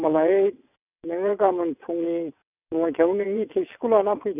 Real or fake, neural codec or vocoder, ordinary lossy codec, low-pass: fake; vocoder, 22.05 kHz, 80 mel bands, WaveNeXt; none; 3.6 kHz